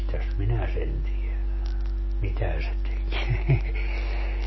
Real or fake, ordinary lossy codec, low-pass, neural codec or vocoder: real; MP3, 24 kbps; 7.2 kHz; none